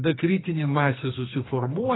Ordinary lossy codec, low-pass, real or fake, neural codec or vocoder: AAC, 16 kbps; 7.2 kHz; fake; codec, 44.1 kHz, 2.6 kbps, SNAC